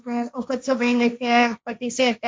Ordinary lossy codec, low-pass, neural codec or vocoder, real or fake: none; none; codec, 16 kHz, 1.1 kbps, Voila-Tokenizer; fake